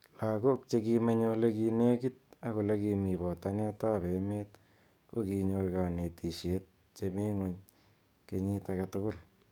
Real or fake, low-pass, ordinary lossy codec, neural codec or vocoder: fake; 19.8 kHz; none; codec, 44.1 kHz, 7.8 kbps, DAC